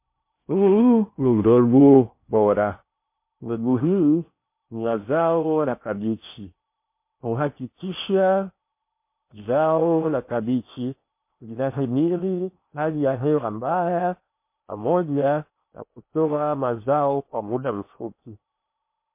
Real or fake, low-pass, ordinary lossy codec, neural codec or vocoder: fake; 3.6 kHz; MP3, 24 kbps; codec, 16 kHz in and 24 kHz out, 0.6 kbps, FocalCodec, streaming, 2048 codes